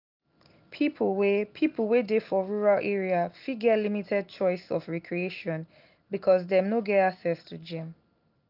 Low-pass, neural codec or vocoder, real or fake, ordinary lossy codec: 5.4 kHz; none; real; none